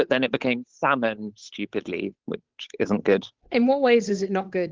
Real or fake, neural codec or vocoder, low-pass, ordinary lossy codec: fake; codec, 16 kHz, 8 kbps, FunCodec, trained on Chinese and English, 25 frames a second; 7.2 kHz; Opus, 16 kbps